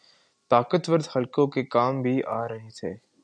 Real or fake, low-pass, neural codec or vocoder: real; 9.9 kHz; none